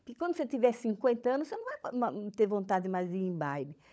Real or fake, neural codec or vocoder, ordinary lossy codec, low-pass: fake; codec, 16 kHz, 16 kbps, FunCodec, trained on LibriTTS, 50 frames a second; none; none